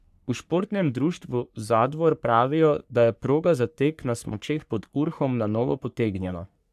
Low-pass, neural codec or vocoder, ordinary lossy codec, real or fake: 14.4 kHz; codec, 44.1 kHz, 3.4 kbps, Pupu-Codec; none; fake